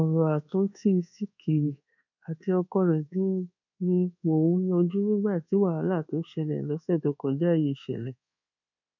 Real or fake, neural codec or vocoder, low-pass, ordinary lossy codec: fake; codec, 24 kHz, 1.2 kbps, DualCodec; 7.2 kHz; none